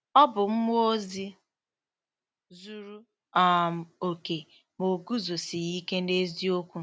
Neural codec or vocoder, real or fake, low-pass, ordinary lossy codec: none; real; none; none